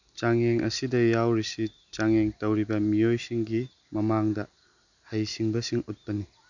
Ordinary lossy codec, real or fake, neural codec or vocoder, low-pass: none; real; none; 7.2 kHz